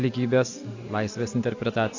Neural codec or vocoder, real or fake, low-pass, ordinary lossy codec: none; real; 7.2 kHz; AAC, 48 kbps